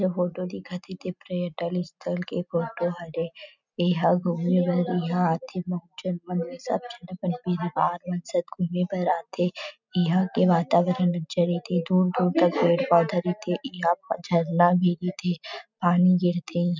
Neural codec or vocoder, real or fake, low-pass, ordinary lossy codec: none; real; 7.2 kHz; none